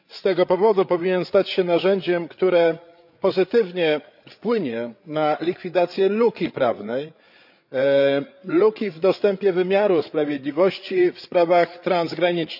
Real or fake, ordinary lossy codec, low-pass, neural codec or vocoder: fake; none; 5.4 kHz; codec, 16 kHz, 8 kbps, FreqCodec, larger model